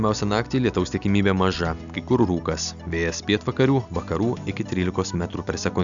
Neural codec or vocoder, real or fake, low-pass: none; real; 7.2 kHz